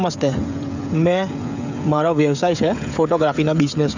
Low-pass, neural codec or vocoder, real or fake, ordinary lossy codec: 7.2 kHz; codec, 16 kHz, 16 kbps, FreqCodec, smaller model; fake; none